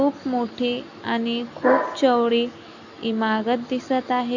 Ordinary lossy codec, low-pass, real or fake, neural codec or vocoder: none; 7.2 kHz; real; none